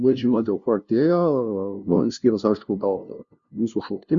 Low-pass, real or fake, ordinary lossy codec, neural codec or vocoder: 7.2 kHz; fake; Opus, 64 kbps; codec, 16 kHz, 0.5 kbps, FunCodec, trained on LibriTTS, 25 frames a second